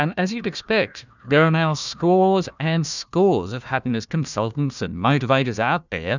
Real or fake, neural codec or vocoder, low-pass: fake; codec, 16 kHz, 1 kbps, FunCodec, trained on Chinese and English, 50 frames a second; 7.2 kHz